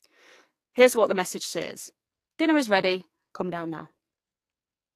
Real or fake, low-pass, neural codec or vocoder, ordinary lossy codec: fake; 14.4 kHz; codec, 44.1 kHz, 2.6 kbps, SNAC; AAC, 64 kbps